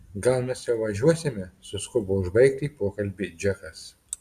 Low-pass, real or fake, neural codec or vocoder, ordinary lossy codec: 14.4 kHz; real; none; Opus, 64 kbps